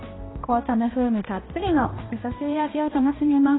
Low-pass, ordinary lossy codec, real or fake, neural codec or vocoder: 7.2 kHz; AAC, 16 kbps; fake; codec, 16 kHz, 1 kbps, X-Codec, HuBERT features, trained on balanced general audio